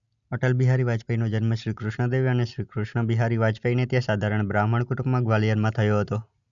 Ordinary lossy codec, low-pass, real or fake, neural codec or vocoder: none; 7.2 kHz; real; none